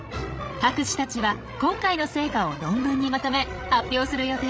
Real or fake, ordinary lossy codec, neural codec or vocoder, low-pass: fake; none; codec, 16 kHz, 16 kbps, FreqCodec, larger model; none